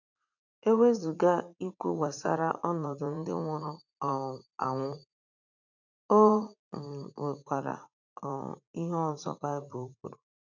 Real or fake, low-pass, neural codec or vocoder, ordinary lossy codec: fake; 7.2 kHz; vocoder, 44.1 kHz, 80 mel bands, Vocos; none